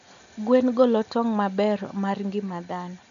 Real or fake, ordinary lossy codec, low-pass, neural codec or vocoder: real; none; 7.2 kHz; none